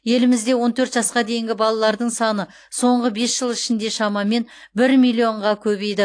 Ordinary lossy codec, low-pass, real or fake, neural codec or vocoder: AAC, 48 kbps; 9.9 kHz; real; none